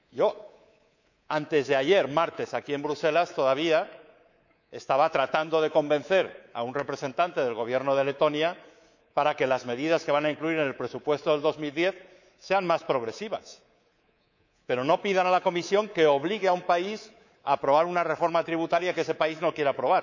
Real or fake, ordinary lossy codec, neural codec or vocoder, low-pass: fake; none; codec, 24 kHz, 3.1 kbps, DualCodec; 7.2 kHz